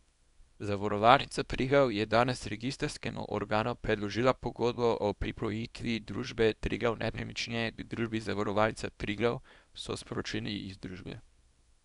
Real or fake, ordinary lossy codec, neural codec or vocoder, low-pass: fake; none; codec, 24 kHz, 0.9 kbps, WavTokenizer, small release; 10.8 kHz